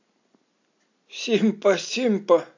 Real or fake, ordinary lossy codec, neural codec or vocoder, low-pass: real; AAC, 48 kbps; none; 7.2 kHz